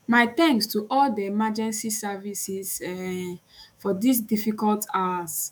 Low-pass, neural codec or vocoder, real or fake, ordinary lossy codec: 19.8 kHz; autoencoder, 48 kHz, 128 numbers a frame, DAC-VAE, trained on Japanese speech; fake; none